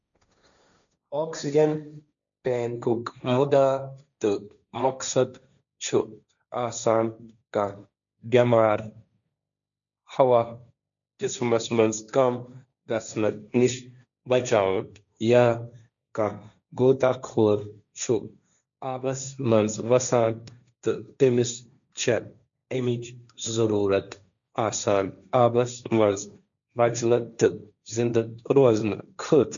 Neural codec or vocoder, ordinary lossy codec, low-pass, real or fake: codec, 16 kHz, 1.1 kbps, Voila-Tokenizer; none; 7.2 kHz; fake